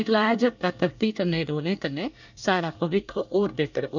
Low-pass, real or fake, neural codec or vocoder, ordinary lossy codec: 7.2 kHz; fake; codec, 24 kHz, 1 kbps, SNAC; none